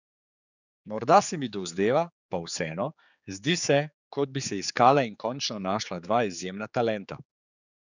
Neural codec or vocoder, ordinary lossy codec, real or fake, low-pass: codec, 16 kHz, 4 kbps, X-Codec, HuBERT features, trained on general audio; none; fake; 7.2 kHz